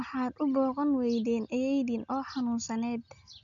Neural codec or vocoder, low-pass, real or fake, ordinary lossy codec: none; 7.2 kHz; real; none